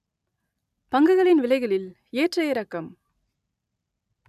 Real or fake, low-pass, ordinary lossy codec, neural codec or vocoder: real; 14.4 kHz; none; none